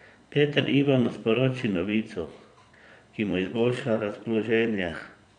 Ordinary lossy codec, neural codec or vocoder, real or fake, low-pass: none; vocoder, 22.05 kHz, 80 mel bands, Vocos; fake; 9.9 kHz